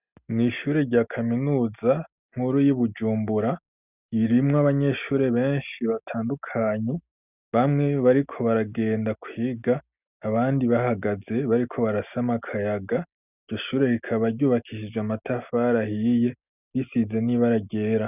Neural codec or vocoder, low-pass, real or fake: none; 3.6 kHz; real